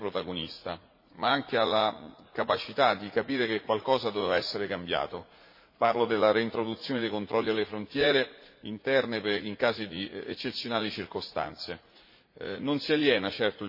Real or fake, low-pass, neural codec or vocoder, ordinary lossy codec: fake; 5.4 kHz; vocoder, 44.1 kHz, 80 mel bands, Vocos; MP3, 24 kbps